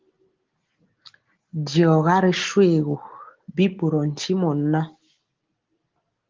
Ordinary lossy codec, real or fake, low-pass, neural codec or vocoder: Opus, 16 kbps; real; 7.2 kHz; none